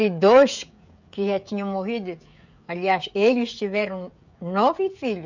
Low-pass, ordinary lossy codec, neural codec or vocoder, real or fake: 7.2 kHz; none; codec, 16 kHz, 16 kbps, FreqCodec, smaller model; fake